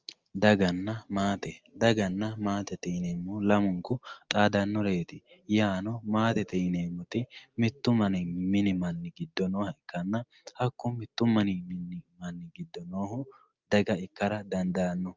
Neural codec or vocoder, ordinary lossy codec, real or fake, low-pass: none; Opus, 24 kbps; real; 7.2 kHz